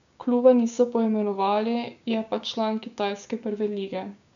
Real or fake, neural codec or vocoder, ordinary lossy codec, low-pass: fake; codec, 16 kHz, 6 kbps, DAC; none; 7.2 kHz